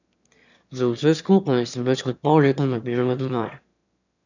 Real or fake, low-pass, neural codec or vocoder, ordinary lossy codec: fake; 7.2 kHz; autoencoder, 22.05 kHz, a latent of 192 numbers a frame, VITS, trained on one speaker; none